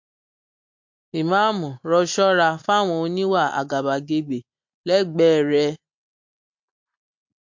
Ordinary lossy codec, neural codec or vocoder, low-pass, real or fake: MP3, 48 kbps; none; 7.2 kHz; real